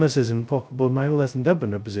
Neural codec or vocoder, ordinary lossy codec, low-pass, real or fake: codec, 16 kHz, 0.2 kbps, FocalCodec; none; none; fake